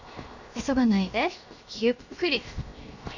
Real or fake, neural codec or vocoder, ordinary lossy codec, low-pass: fake; codec, 16 kHz, 0.7 kbps, FocalCodec; none; 7.2 kHz